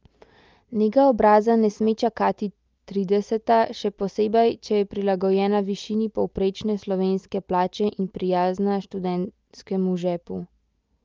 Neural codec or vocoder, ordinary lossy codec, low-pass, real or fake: none; Opus, 24 kbps; 7.2 kHz; real